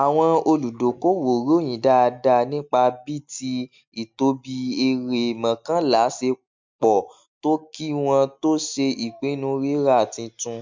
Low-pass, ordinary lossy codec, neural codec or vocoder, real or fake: 7.2 kHz; MP3, 64 kbps; none; real